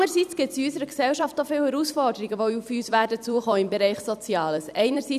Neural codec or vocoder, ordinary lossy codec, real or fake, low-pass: vocoder, 44.1 kHz, 128 mel bands every 256 samples, BigVGAN v2; none; fake; 14.4 kHz